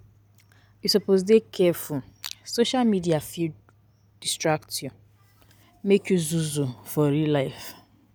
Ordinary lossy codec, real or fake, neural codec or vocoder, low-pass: none; real; none; none